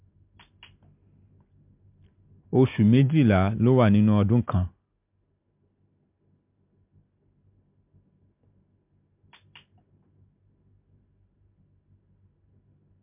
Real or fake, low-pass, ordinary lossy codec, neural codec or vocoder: real; 3.6 kHz; MP3, 32 kbps; none